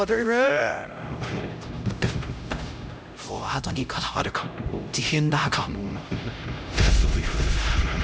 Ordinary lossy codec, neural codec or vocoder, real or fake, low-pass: none; codec, 16 kHz, 0.5 kbps, X-Codec, HuBERT features, trained on LibriSpeech; fake; none